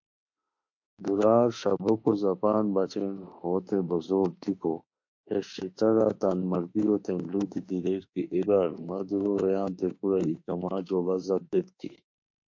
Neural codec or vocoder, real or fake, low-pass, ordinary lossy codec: autoencoder, 48 kHz, 32 numbers a frame, DAC-VAE, trained on Japanese speech; fake; 7.2 kHz; MP3, 48 kbps